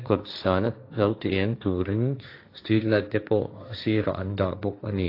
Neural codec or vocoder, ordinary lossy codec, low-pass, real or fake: codec, 16 kHz, 1.1 kbps, Voila-Tokenizer; AAC, 32 kbps; 5.4 kHz; fake